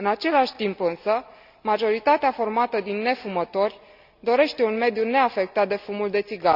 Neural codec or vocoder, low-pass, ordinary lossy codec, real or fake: none; 5.4 kHz; Opus, 64 kbps; real